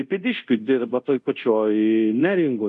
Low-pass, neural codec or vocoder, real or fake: 10.8 kHz; codec, 24 kHz, 0.5 kbps, DualCodec; fake